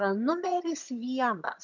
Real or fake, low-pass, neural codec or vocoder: fake; 7.2 kHz; vocoder, 22.05 kHz, 80 mel bands, HiFi-GAN